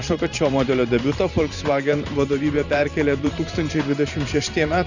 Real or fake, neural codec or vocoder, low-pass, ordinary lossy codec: fake; vocoder, 44.1 kHz, 128 mel bands every 512 samples, BigVGAN v2; 7.2 kHz; Opus, 64 kbps